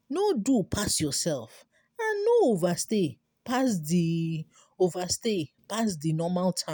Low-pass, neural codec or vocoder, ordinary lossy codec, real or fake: none; none; none; real